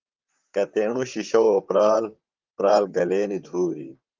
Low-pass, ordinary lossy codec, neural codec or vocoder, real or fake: 7.2 kHz; Opus, 32 kbps; vocoder, 44.1 kHz, 128 mel bands, Pupu-Vocoder; fake